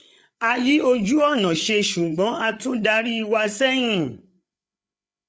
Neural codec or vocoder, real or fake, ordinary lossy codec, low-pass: codec, 16 kHz, 8 kbps, FreqCodec, larger model; fake; none; none